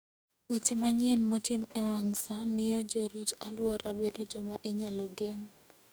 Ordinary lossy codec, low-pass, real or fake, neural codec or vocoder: none; none; fake; codec, 44.1 kHz, 2.6 kbps, DAC